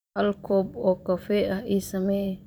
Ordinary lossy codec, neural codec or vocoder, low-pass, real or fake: none; vocoder, 44.1 kHz, 128 mel bands every 512 samples, BigVGAN v2; none; fake